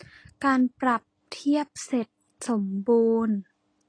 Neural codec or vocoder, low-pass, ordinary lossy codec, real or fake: none; 9.9 kHz; AAC, 32 kbps; real